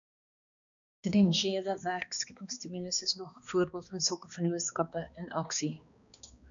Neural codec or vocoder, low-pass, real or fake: codec, 16 kHz, 2 kbps, X-Codec, HuBERT features, trained on balanced general audio; 7.2 kHz; fake